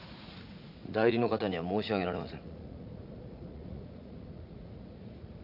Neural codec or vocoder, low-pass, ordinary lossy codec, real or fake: vocoder, 44.1 kHz, 80 mel bands, Vocos; 5.4 kHz; none; fake